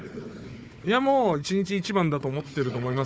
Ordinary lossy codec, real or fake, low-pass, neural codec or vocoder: none; fake; none; codec, 16 kHz, 4 kbps, FunCodec, trained on Chinese and English, 50 frames a second